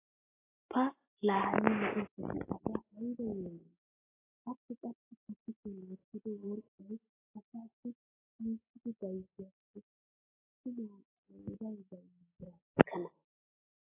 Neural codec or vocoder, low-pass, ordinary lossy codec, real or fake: none; 3.6 kHz; AAC, 16 kbps; real